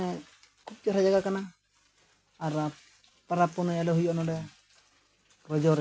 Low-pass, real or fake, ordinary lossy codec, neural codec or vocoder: none; real; none; none